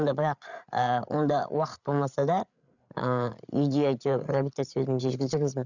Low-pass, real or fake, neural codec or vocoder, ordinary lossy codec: 7.2 kHz; fake; codec, 16 kHz, 8 kbps, FreqCodec, larger model; none